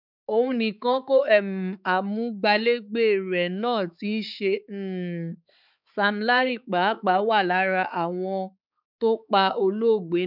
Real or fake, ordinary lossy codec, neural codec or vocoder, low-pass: fake; none; codec, 16 kHz, 4 kbps, X-Codec, HuBERT features, trained on balanced general audio; 5.4 kHz